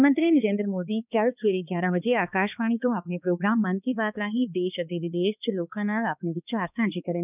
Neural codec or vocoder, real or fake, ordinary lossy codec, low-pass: codec, 16 kHz, 2 kbps, X-Codec, HuBERT features, trained on balanced general audio; fake; none; 3.6 kHz